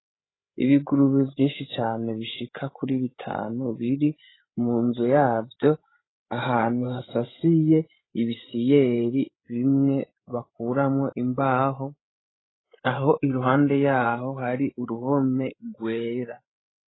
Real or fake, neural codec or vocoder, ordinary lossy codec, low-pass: fake; codec, 16 kHz, 16 kbps, FreqCodec, larger model; AAC, 16 kbps; 7.2 kHz